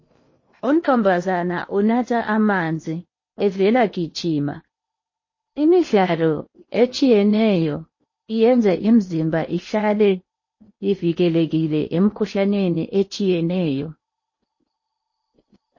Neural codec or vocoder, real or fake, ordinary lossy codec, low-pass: codec, 16 kHz in and 24 kHz out, 0.8 kbps, FocalCodec, streaming, 65536 codes; fake; MP3, 32 kbps; 7.2 kHz